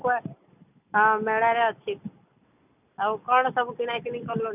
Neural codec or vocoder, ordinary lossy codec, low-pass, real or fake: none; none; 3.6 kHz; real